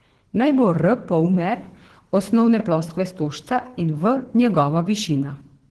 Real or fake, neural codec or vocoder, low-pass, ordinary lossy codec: fake; codec, 24 kHz, 3 kbps, HILCodec; 10.8 kHz; Opus, 16 kbps